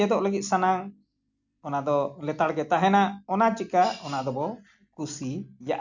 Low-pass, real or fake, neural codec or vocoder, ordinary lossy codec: 7.2 kHz; real; none; none